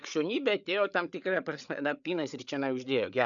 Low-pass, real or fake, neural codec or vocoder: 7.2 kHz; fake; codec, 16 kHz, 8 kbps, FreqCodec, larger model